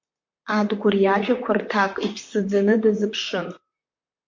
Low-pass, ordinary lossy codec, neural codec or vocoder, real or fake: 7.2 kHz; MP3, 48 kbps; vocoder, 44.1 kHz, 128 mel bands, Pupu-Vocoder; fake